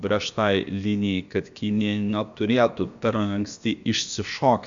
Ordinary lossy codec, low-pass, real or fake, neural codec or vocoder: Opus, 64 kbps; 7.2 kHz; fake; codec, 16 kHz, about 1 kbps, DyCAST, with the encoder's durations